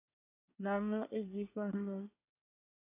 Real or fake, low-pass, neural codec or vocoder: fake; 3.6 kHz; codec, 24 kHz, 1 kbps, SNAC